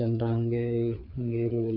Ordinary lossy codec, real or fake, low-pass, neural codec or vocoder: none; fake; 5.4 kHz; codec, 16 kHz, 4 kbps, FreqCodec, larger model